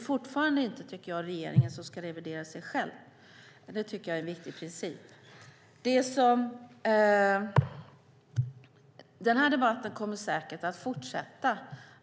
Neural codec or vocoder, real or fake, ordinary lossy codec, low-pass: none; real; none; none